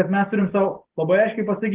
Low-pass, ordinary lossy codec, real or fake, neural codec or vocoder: 3.6 kHz; Opus, 16 kbps; real; none